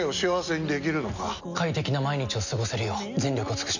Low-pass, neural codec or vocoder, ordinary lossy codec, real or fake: 7.2 kHz; none; none; real